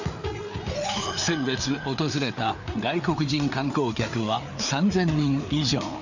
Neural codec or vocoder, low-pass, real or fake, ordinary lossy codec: codec, 16 kHz, 4 kbps, FreqCodec, larger model; 7.2 kHz; fake; none